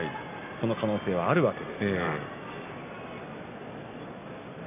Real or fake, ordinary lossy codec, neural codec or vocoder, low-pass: real; none; none; 3.6 kHz